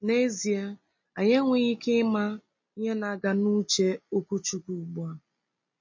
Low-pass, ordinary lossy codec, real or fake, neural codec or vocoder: 7.2 kHz; MP3, 32 kbps; real; none